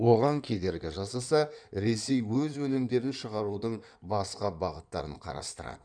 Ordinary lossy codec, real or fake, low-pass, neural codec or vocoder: none; fake; 9.9 kHz; codec, 16 kHz in and 24 kHz out, 2.2 kbps, FireRedTTS-2 codec